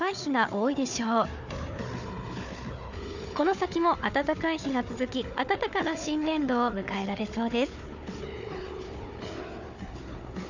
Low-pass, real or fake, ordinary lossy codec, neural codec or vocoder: 7.2 kHz; fake; none; codec, 16 kHz, 4 kbps, FunCodec, trained on Chinese and English, 50 frames a second